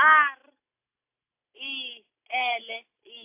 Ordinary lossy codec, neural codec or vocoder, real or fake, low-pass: AAC, 32 kbps; none; real; 3.6 kHz